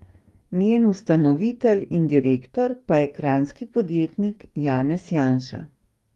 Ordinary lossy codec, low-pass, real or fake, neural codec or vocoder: Opus, 32 kbps; 19.8 kHz; fake; codec, 44.1 kHz, 2.6 kbps, DAC